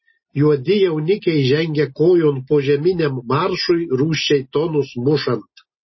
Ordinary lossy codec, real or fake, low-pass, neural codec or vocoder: MP3, 24 kbps; real; 7.2 kHz; none